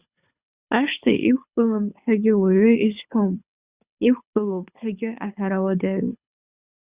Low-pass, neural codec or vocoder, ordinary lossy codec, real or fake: 3.6 kHz; codec, 24 kHz, 6 kbps, HILCodec; Opus, 64 kbps; fake